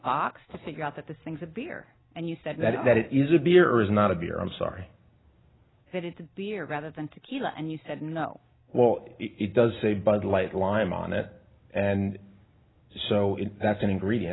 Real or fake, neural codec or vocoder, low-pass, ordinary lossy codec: real; none; 7.2 kHz; AAC, 16 kbps